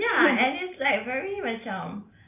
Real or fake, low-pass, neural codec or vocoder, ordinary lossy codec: real; 3.6 kHz; none; none